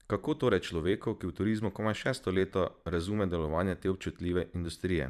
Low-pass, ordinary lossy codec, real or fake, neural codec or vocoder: 14.4 kHz; none; fake; vocoder, 44.1 kHz, 128 mel bands every 512 samples, BigVGAN v2